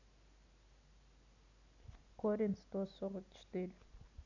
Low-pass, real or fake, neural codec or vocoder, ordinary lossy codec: 7.2 kHz; real; none; none